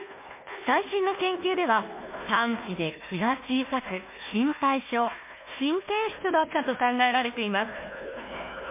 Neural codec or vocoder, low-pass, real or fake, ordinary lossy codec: codec, 16 kHz, 1 kbps, FunCodec, trained on Chinese and English, 50 frames a second; 3.6 kHz; fake; MP3, 24 kbps